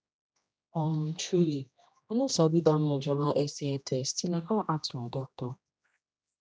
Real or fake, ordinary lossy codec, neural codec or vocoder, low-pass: fake; none; codec, 16 kHz, 1 kbps, X-Codec, HuBERT features, trained on general audio; none